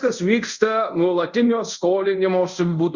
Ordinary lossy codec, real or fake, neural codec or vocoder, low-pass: Opus, 64 kbps; fake; codec, 24 kHz, 0.5 kbps, DualCodec; 7.2 kHz